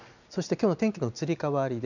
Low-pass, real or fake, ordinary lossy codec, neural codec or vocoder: 7.2 kHz; real; none; none